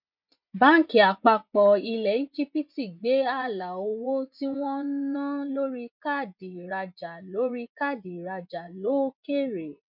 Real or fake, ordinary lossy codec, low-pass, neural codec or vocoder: fake; none; 5.4 kHz; vocoder, 24 kHz, 100 mel bands, Vocos